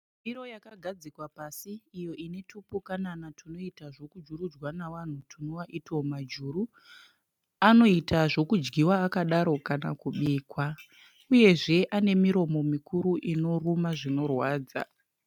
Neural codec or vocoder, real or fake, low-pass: none; real; 19.8 kHz